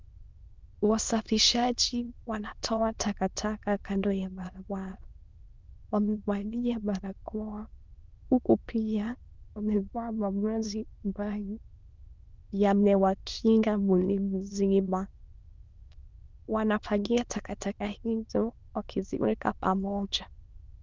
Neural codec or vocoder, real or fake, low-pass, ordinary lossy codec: autoencoder, 22.05 kHz, a latent of 192 numbers a frame, VITS, trained on many speakers; fake; 7.2 kHz; Opus, 24 kbps